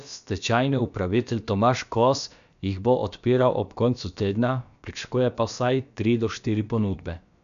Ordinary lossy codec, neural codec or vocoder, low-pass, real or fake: MP3, 96 kbps; codec, 16 kHz, about 1 kbps, DyCAST, with the encoder's durations; 7.2 kHz; fake